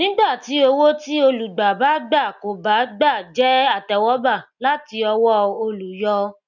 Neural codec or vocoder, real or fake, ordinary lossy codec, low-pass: none; real; none; 7.2 kHz